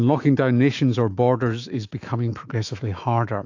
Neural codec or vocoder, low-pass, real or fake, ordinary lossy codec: autoencoder, 48 kHz, 128 numbers a frame, DAC-VAE, trained on Japanese speech; 7.2 kHz; fake; AAC, 48 kbps